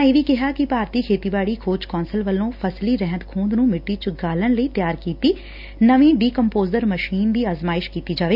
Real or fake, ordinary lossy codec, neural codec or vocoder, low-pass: real; none; none; 5.4 kHz